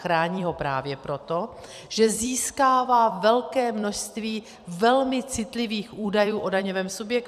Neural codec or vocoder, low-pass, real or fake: vocoder, 44.1 kHz, 128 mel bands every 256 samples, BigVGAN v2; 14.4 kHz; fake